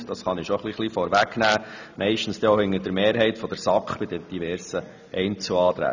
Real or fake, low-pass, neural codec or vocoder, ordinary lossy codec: real; 7.2 kHz; none; none